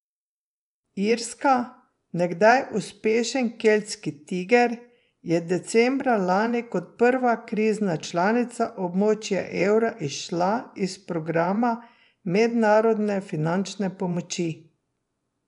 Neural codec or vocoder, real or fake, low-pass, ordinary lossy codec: vocoder, 24 kHz, 100 mel bands, Vocos; fake; 10.8 kHz; none